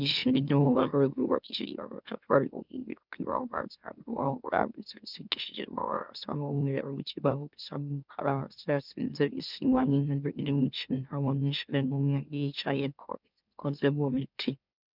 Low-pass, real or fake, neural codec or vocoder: 5.4 kHz; fake; autoencoder, 44.1 kHz, a latent of 192 numbers a frame, MeloTTS